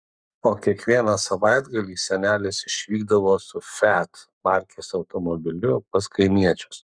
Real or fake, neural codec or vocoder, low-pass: fake; codec, 44.1 kHz, 7.8 kbps, Pupu-Codec; 9.9 kHz